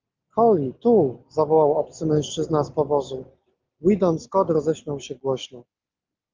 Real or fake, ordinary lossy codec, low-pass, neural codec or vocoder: real; Opus, 32 kbps; 7.2 kHz; none